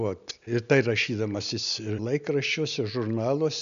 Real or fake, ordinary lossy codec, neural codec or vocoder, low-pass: real; MP3, 96 kbps; none; 7.2 kHz